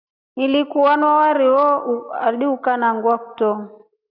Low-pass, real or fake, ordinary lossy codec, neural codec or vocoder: 5.4 kHz; real; AAC, 48 kbps; none